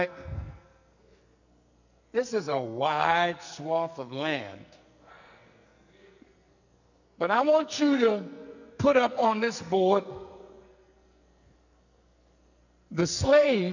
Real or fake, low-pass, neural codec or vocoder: fake; 7.2 kHz; codec, 44.1 kHz, 2.6 kbps, SNAC